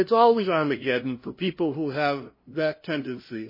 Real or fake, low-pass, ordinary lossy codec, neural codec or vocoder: fake; 5.4 kHz; MP3, 24 kbps; codec, 16 kHz, 0.5 kbps, FunCodec, trained on LibriTTS, 25 frames a second